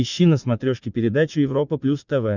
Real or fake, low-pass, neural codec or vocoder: fake; 7.2 kHz; vocoder, 44.1 kHz, 80 mel bands, Vocos